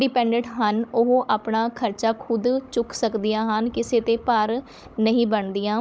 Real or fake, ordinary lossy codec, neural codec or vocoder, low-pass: fake; none; codec, 16 kHz, 16 kbps, FunCodec, trained on Chinese and English, 50 frames a second; none